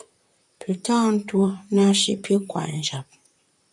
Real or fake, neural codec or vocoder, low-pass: fake; vocoder, 44.1 kHz, 128 mel bands, Pupu-Vocoder; 10.8 kHz